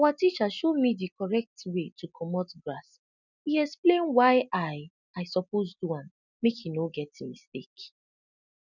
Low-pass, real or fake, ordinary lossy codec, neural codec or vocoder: 7.2 kHz; real; none; none